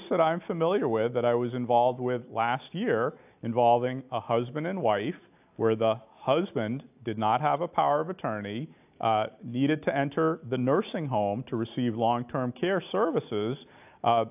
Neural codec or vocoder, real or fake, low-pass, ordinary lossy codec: none; real; 3.6 kHz; AAC, 32 kbps